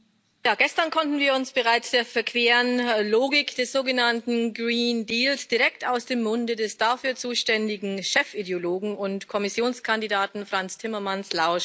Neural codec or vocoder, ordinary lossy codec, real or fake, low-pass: none; none; real; none